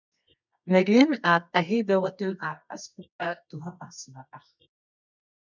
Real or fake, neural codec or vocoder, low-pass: fake; codec, 24 kHz, 0.9 kbps, WavTokenizer, medium music audio release; 7.2 kHz